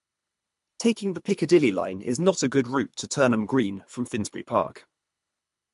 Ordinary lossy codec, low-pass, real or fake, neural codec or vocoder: MP3, 64 kbps; 10.8 kHz; fake; codec, 24 kHz, 3 kbps, HILCodec